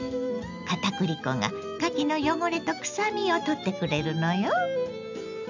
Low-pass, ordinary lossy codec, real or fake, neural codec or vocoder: 7.2 kHz; none; real; none